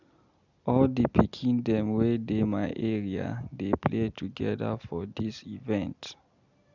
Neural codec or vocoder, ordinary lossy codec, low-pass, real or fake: none; none; 7.2 kHz; real